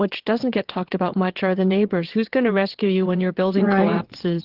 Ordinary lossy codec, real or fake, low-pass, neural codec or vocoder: Opus, 16 kbps; fake; 5.4 kHz; vocoder, 22.05 kHz, 80 mel bands, WaveNeXt